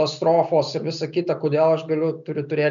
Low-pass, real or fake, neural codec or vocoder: 7.2 kHz; real; none